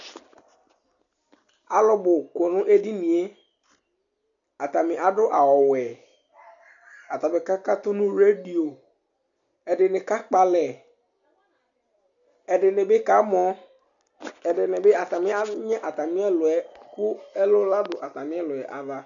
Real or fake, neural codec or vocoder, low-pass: real; none; 7.2 kHz